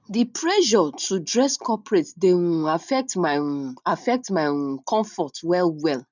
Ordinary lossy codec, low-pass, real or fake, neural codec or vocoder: none; 7.2 kHz; real; none